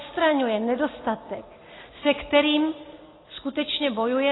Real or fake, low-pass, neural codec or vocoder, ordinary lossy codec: real; 7.2 kHz; none; AAC, 16 kbps